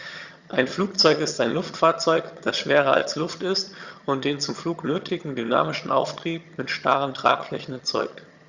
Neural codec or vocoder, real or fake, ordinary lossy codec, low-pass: vocoder, 22.05 kHz, 80 mel bands, HiFi-GAN; fake; Opus, 64 kbps; 7.2 kHz